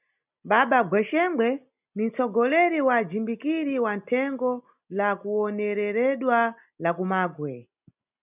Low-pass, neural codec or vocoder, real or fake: 3.6 kHz; none; real